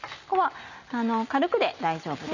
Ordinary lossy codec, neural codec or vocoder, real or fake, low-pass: none; none; real; 7.2 kHz